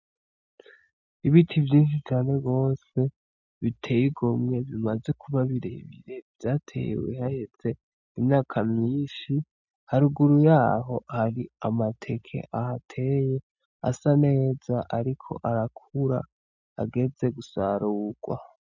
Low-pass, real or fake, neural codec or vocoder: 7.2 kHz; real; none